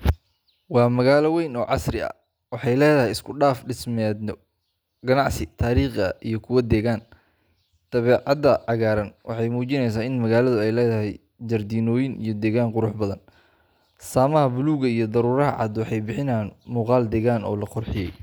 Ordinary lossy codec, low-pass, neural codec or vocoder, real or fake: none; none; none; real